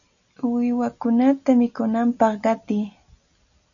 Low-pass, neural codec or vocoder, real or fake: 7.2 kHz; none; real